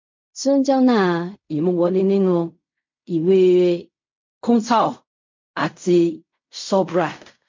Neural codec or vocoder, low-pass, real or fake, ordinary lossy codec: codec, 16 kHz in and 24 kHz out, 0.4 kbps, LongCat-Audio-Codec, fine tuned four codebook decoder; 7.2 kHz; fake; MP3, 48 kbps